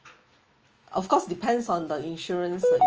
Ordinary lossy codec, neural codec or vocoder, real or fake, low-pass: Opus, 24 kbps; autoencoder, 48 kHz, 128 numbers a frame, DAC-VAE, trained on Japanese speech; fake; 7.2 kHz